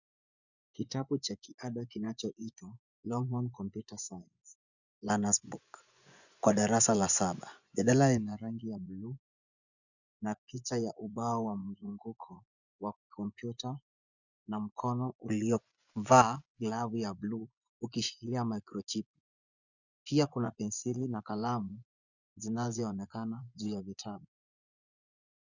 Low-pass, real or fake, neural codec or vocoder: 7.2 kHz; fake; vocoder, 24 kHz, 100 mel bands, Vocos